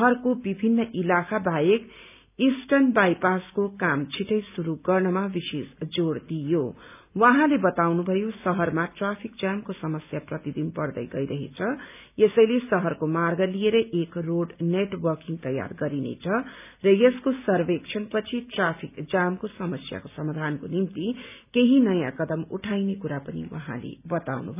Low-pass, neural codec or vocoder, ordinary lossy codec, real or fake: 3.6 kHz; none; none; real